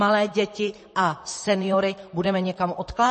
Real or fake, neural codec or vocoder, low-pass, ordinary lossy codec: fake; vocoder, 44.1 kHz, 128 mel bands, Pupu-Vocoder; 10.8 kHz; MP3, 32 kbps